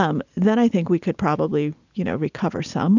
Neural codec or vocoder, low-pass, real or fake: none; 7.2 kHz; real